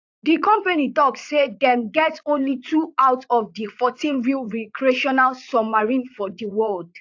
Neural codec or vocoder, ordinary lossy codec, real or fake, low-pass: vocoder, 44.1 kHz, 80 mel bands, Vocos; AAC, 48 kbps; fake; 7.2 kHz